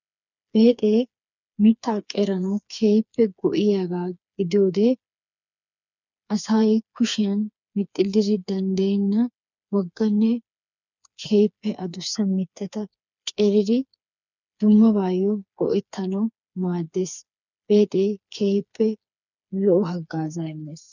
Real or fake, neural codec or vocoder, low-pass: fake; codec, 16 kHz, 4 kbps, FreqCodec, smaller model; 7.2 kHz